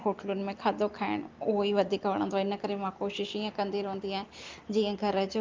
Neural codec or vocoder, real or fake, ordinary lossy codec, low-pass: none; real; Opus, 24 kbps; 7.2 kHz